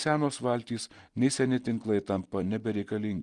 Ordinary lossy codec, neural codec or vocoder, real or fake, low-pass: Opus, 24 kbps; none; real; 10.8 kHz